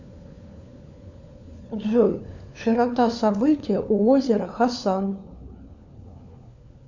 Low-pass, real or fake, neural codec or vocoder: 7.2 kHz; fake; codec, 16 kHz, 4 kbps, FunCodec, trained on LibriTTS, 50 frames a second